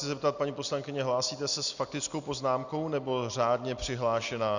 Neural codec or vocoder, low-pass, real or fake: none; 7.2 kHz; real